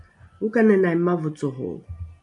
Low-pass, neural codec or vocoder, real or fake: 10.8 kHz; none; real